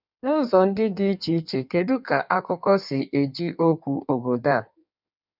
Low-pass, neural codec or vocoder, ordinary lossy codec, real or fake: 5.4 kHz; codec, 16 kHz in and 24 kHz out, 1.1 kbps, FireRedTTS-2 codec; none; fake